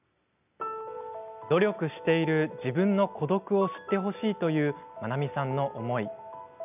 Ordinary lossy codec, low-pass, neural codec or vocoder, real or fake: none; 3.6 kHz; none; real